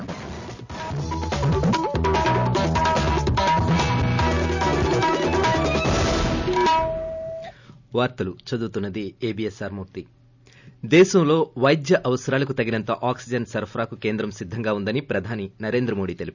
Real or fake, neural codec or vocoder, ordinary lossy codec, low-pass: real; none; none; 7.2 kHz